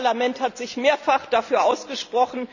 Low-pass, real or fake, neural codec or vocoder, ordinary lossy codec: 7.2 kHz; real; none; none